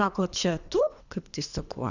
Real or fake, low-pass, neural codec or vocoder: fake; 7.2 kHz; codec, 24 kHz, 3 kbps, HILCodec